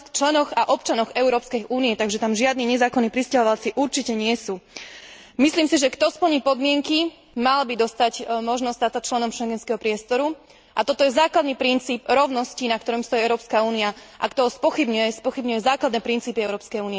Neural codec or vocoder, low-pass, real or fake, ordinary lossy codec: none; none; real; none